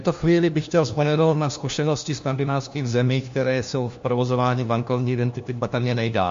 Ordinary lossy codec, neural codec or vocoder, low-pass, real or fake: MP3, 48 kbps; codec, 16 kHz, 1 kbps, FunCodec, trained on LibriTTS, 50 frames a second; 7.2 kHz; fake